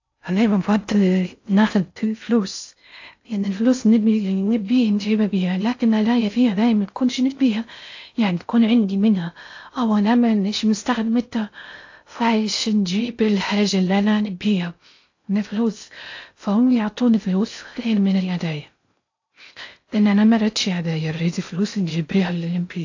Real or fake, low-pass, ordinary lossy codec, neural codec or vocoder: fake; 7.2 kHz; AAC, 48 kbps; codec, 16 kHz in and 24 kHz out, 0.6 kbps, FocalCodec, streaming, 4096 codes